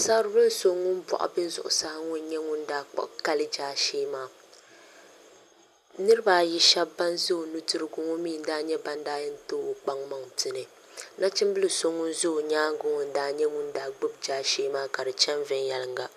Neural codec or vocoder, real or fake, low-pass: none; real; 14.4 kHz